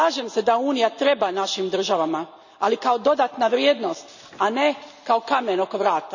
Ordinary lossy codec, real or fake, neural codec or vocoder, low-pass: none; real; none; 7.2 kHz